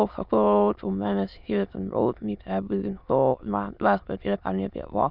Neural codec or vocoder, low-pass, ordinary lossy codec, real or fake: autoencoder, 22.05 kHz, a latent of 192 numbers a frame, VITS, trained on many speakers; 5.4 kHz; none; fake